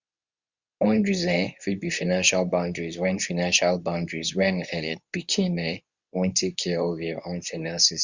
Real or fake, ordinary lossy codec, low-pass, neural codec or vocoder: fake; Opus, 64 kbps; 7.2 kHz; codec, 24 kHz, 0.9 kbps, WavTokenizer, medium speech release version 2